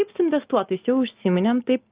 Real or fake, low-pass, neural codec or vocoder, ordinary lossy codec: real; 3.6 kHz; none; Opus, 32 kbps